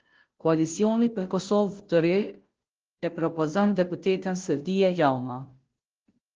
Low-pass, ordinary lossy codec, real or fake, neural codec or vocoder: 7.2 kHz; Opus, 16 kbps; fake; codec, 16 kHz, 0.5 kbps, FunCodec, trained on Chinese and English, 25 frames a second